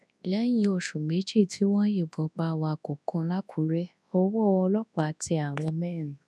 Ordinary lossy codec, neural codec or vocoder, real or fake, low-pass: none; codec, 24 kHz, 0.9 kbps, WavTokenizer, large speech release; fake; none